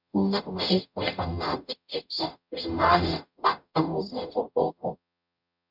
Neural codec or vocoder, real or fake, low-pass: codec, 44.1 kHz, 0.9 kbps, DAC; fake; 5.4 kHz